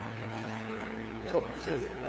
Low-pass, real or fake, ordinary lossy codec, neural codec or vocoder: none; fake; none; codec, 16 kHz, 2 kbps, FunCodec, trained on LibriTTS, 25 frames a second